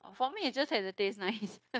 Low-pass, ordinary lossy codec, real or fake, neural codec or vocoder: none; none; fake; codec, 16 kHz, 0.9 kbps, LongCat-Audio-Codec